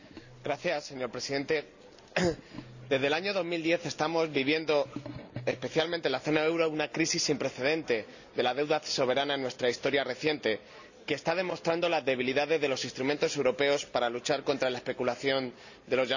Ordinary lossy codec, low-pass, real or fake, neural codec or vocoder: none; 7.2 kHz; real; none